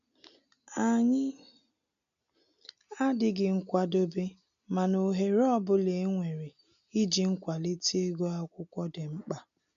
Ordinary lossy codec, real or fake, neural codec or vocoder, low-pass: none; real; none; 7.2 kHz